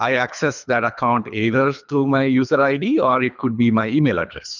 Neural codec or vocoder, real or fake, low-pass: codec, 24 kHz, 3 kbps, HILCodec; fake; 7.2 kHz